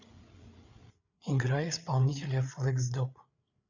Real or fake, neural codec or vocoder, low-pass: fake; codec, 16 kHz, 8 kbps, FreqCodec, larger model; 7.2 kHz